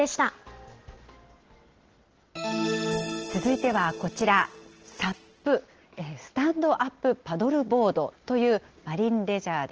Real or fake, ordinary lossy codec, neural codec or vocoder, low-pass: real; Opus, 16 kbps; none; 7.2 kHz